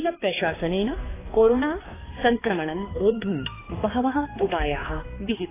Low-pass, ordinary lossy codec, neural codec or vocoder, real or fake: 3.6 kHz; AAC, 16 kbps; codec, 16 kHz, 2 kbps, X-Codec, HuBERT features, trained on balanced general audio; fake